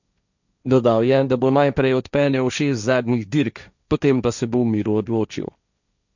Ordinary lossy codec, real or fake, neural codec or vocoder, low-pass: none; fake; codec, 16 kHz, 1.1 kbps, Voila-Tokenizer; 7.2 kHz